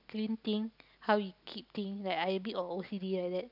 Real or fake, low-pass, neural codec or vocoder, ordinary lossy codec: real; 5.4 kHz; none; none